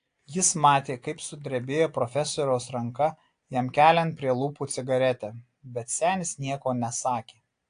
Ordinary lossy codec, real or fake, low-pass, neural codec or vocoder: AAC, 48 kbps; real; 9.9 kHz; none